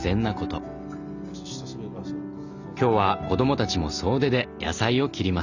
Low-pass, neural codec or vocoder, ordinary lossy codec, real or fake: 7.2 kHz; none; none; real